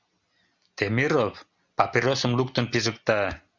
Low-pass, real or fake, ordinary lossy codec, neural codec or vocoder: 7.2 kHz; real; Opus, 64 kbps; none